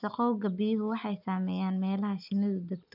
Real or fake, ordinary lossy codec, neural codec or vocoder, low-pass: real; none; none; 5.4 kHz